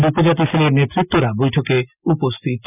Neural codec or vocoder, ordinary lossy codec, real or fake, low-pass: none; none; real; 3.6 kHz